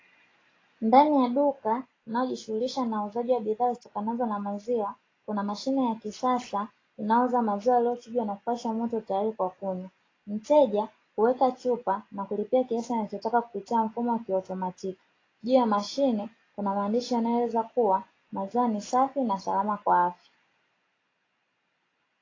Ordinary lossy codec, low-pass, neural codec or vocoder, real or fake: AAC, 32 kbps; 7.2 kHz; none; real